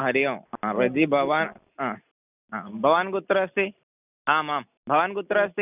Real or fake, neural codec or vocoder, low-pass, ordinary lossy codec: real; none; 3.6 kHz; none